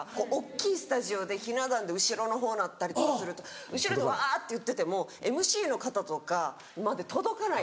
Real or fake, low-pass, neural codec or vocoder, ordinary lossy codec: real; none; none; none